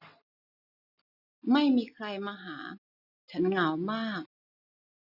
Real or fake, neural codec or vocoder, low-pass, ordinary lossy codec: real; none; 5.4 kHz; none